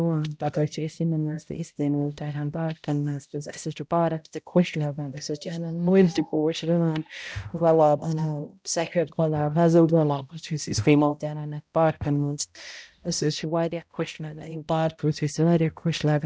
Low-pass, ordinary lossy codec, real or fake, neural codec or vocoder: none; none; fake; codec, 16 kHz, 0.5 kbps, X-Codec, HuBERT features, trained on balanced general audio